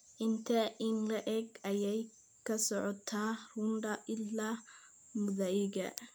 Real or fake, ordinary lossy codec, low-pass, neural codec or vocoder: real; none; none; none